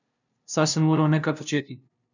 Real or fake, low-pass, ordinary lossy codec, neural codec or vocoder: fake; 7.2 kHz; none; codec, 16 kHz, 0.5 kbps, FunCodec, trained on LibriTTS, 25 frames a second